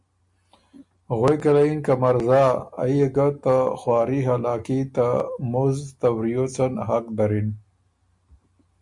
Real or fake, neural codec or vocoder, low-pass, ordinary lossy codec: real; none; 10.8 kHz; MP3, 64 kbps